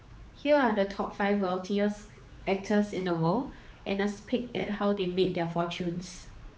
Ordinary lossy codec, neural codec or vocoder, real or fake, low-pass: none; codec, 16 kHz, 4 kbps, X-Codec, HuBERT features, trained on general audio; fake; none